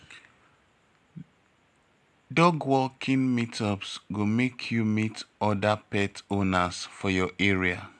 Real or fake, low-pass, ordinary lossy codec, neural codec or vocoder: real; none; none; none